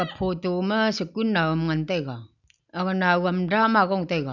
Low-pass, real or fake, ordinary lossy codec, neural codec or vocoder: 7.2 kHz; real; none; none